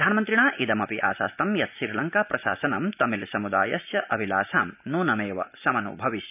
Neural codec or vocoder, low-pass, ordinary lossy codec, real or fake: none; 3.6 kHz; none; real